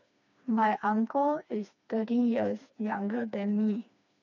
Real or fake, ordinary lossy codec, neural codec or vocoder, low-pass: fake; none; codec, 16 kHz, 2 kbps, FreqCodec, smaller model; 7.2 kHz